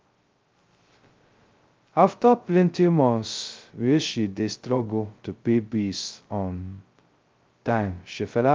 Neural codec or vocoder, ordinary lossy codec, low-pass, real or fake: codec, 16 kHz, 0.2 kbps, FocalCodec; Opus, 24 kbps; 7.2 kHz; fake